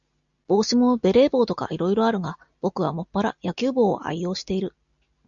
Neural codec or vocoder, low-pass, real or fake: none; 7.2 kHz; real